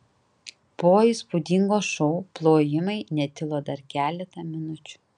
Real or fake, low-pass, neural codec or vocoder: real; 9.9 kHz; none